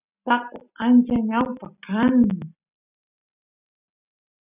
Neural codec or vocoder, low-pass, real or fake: none; 3.6 kHz; real